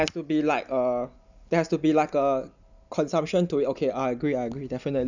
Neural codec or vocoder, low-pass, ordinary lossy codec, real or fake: none; 7.2 kHz; none; real